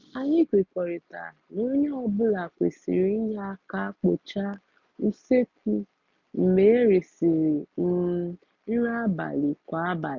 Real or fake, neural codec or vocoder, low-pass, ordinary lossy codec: real; none; 7.2 kHz; none